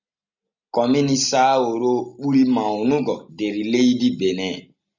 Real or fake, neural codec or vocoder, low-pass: real; none; 7.2 kHz